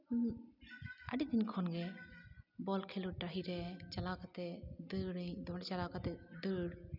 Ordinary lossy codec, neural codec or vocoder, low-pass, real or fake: none; none; 5.4 kHz; real